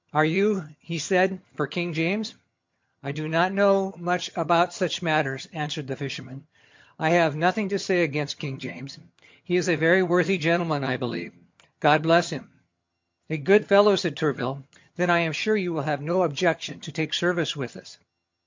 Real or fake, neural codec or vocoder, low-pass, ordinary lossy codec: fake; vocoder, 22.05 kHz, 80 mel bands, HiFi-GAN; 7.2 kHz; MP3, 48 kbps